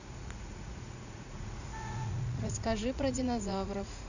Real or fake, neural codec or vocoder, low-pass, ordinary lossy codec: fake; vocoder, 44.1 kHz, 80 mel bands, Vocos; 7.2 kHz; none